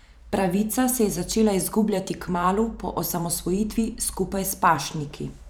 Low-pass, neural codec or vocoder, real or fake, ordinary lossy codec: none; vocoder, 44.1 kHz, 128 mel bands every 512 samples, BigVGAN v2; fake; none